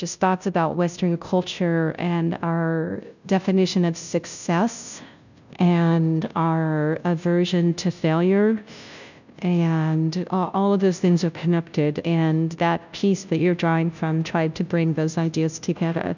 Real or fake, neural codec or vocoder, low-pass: fake; codec, 16 kHz, 0.5 kbps, FunCodec, trained on Chinese and English, 25 frames a second; 7.2 kHz